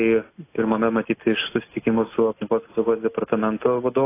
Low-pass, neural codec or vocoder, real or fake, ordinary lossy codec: 3.6 kHz; none; real; AAC, 24 kbps